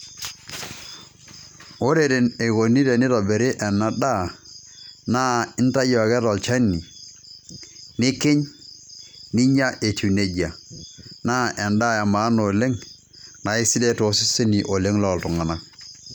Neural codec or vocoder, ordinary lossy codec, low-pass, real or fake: none; none; none; real